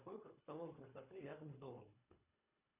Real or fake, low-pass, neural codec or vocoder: fake; 3.6 kHz; codec, 24 kHz, 3 kbps, HILCodec